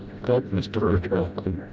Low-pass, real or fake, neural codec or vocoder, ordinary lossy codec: none; fake; codec, 16 kHz, 0.5 kbps, FreqCodec, smaller model; none